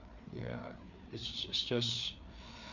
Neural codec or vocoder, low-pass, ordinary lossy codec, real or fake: codec, 16 kHz, 4 kbps, FreqCodec, larger model; 7.2 kHz; none; fake